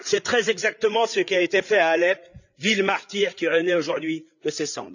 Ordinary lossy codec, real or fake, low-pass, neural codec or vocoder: none; fake; 7.2 kHz; codec, 16 kHz, 8 kbps, FreqCodec, larger model